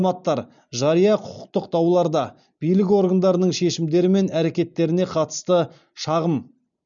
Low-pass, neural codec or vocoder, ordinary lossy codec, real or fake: 7.2 kHz; none; MP3, 96 kbps; real